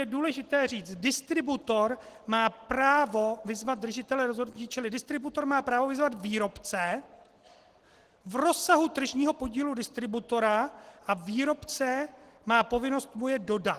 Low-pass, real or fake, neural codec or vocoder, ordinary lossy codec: 14.4 kHz; real; none; Opus, 16 kbps